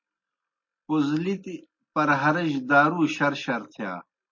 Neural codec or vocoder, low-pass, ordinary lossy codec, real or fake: none; 7.2 kHz; MP3, 32 kbps; real